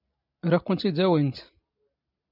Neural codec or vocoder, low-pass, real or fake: none; 5.4 kHz; real